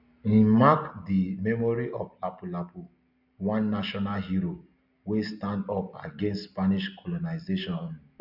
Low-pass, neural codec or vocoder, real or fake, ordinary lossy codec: 5.4 kHz; none; real; none